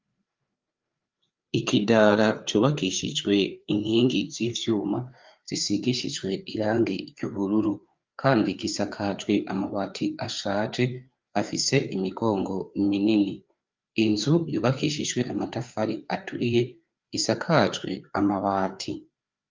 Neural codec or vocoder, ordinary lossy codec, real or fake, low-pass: codec, 16 kHz, 4 kbps, FreqCodec, larger model; Opus, 24 kbps; fake; 7.2 kHz